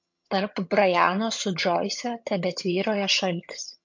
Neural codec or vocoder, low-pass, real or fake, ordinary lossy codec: vocoder, 22.05 kHz, 80 mel bands, HiFi-GAN; 7.2 kHz; fake; MP3, 48 kbps